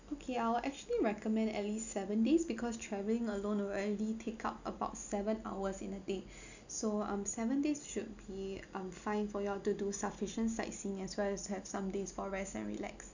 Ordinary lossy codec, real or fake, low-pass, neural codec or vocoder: none; real; 7.2 kHz; none